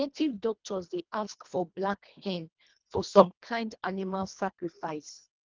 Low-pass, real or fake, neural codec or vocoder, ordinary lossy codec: 7.2 kHz; fake; codec, 24 kHz, 1.5 kbps, HILCodec; Opus, 24 kbps